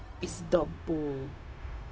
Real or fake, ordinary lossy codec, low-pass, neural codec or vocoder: fake; none; none; codec, 16 kHz, 0.4 kbps, LongCat-Audio-Codec